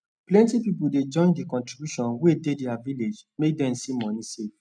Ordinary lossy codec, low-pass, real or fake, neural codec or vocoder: none; 9.9 kHz; real; none